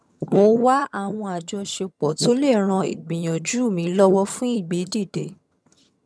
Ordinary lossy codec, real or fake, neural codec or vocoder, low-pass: none; fake; vocoder, 22.05 kHz, 80 mel bands, HiFi-GAN; none